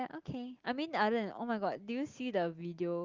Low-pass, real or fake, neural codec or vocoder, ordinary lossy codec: 7.2 kHz; real; none; Opus, 24 kbps